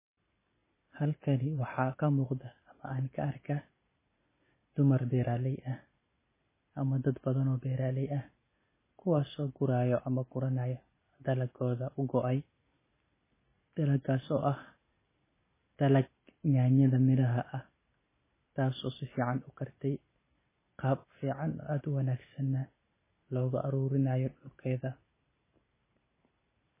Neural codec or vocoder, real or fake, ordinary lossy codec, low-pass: none; real; MP3, 16 kbps; 3.6 kHz